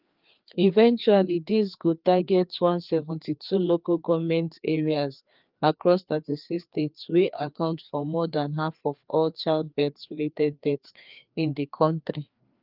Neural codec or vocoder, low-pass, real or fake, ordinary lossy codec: codec, 16 kHz, 2 kbps, FreqCodec, larger model; 5.4 kHz; fake; Opus, 24 kbps